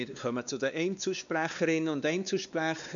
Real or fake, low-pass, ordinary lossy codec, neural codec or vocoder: fake; 7.2 kHz; none; codec, 16 kHz, 2 kbps, X-Codec, WavLM features, trained on Multilingual LibriSpeech